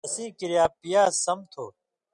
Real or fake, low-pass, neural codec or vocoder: real; 10.8 kHz; none